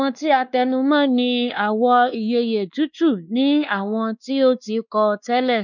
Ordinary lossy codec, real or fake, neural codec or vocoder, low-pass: none; fake; codec, 16 kHz, 2 kbps, X-Codec, WavLM features, trained on Multilingual LibriSpeech; 7.2 kHz